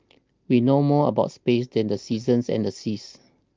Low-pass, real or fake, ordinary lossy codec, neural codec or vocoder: 7.2 kHz; real; Opus, 32 kbps; none